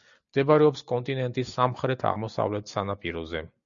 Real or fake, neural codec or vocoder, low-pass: real; none; 7.2 kHz